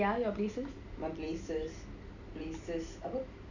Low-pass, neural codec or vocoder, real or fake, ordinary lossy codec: 7.2 kHz; none; real; none